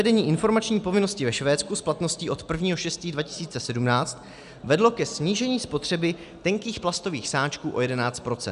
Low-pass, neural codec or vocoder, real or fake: 10.8 kHz; none; real